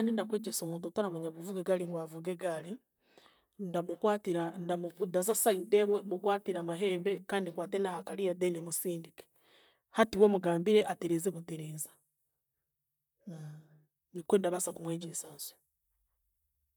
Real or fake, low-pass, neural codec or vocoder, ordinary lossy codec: fake; none; vocoder, 44.1 kHz, 128 mel bands, Pupu-Vocoder; none